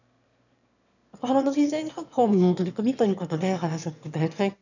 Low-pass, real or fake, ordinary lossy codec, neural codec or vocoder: 7.2 kHz; fake; none; autoencoder, 22.05 kHz, a latent of 192 numbers a frame, VITS, trained on one speaker